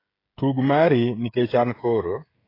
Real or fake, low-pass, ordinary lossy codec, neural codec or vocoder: fake; 5.4 kHz; AAC, 24 kbps; codec, 16 kHz, 16 kbps, FreqCodec, smaller model